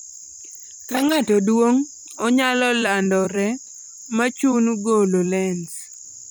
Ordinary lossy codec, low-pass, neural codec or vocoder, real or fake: none; none; vocoder, 44.1 kHz, 128 mel bands every 256 samples, BigVGAN v2; fake